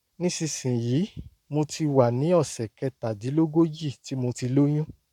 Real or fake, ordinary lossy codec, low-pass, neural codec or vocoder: fake; none; 19.8 kHz; codec, 44.1 kHz, 7.8 kbps, Pupu-Codec